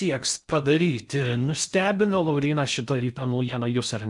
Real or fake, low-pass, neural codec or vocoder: fake; 10.8 kHz; codec, 16 kHz in and 24 kHz out, 0.6 kbps, FocalCodec, streaming, 4096 codes